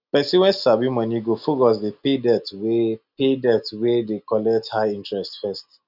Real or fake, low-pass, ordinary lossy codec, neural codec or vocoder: real; 5.4 kHz; none; none